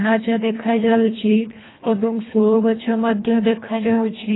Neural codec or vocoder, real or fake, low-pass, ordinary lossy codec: codec, 24 kHz, 1.5 kbps, HILCodec; fake; 7.2 kHz; AAC, 16 kbps